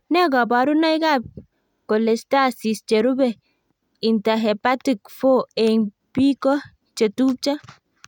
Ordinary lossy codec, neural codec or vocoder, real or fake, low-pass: none; none; real; 19.8 kHz